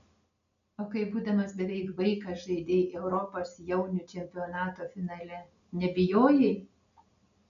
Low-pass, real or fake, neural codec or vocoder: 7.2 kHz; real; none